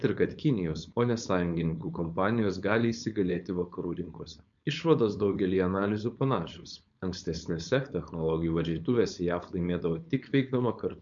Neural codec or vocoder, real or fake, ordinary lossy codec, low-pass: codec, 16 kHz, 4.8 kbps, FACodec; fake; MP3, 64 kbps; 7.2 kHz